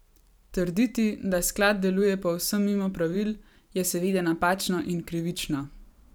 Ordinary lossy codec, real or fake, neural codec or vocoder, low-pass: none; fake; vocoder, 44.1 kHz, 128 mel bands every 512 samples, BigVGAN v2; none